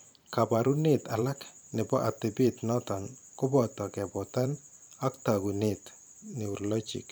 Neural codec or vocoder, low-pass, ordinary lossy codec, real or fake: vocoder, 44.1 kHz, 128 mel bands every 512 samples, BigVGAN v2; none; none; fake